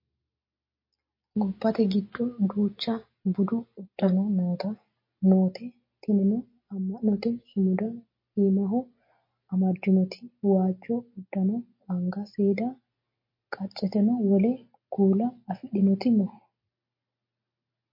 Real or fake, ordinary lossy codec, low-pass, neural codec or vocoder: real; MP3, 32 kbps; 5.4 kHz; none